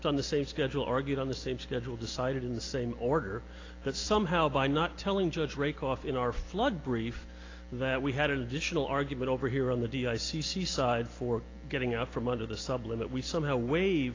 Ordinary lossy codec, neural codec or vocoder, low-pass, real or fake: AAC, 32 kbps; none; 7.2 kHz; real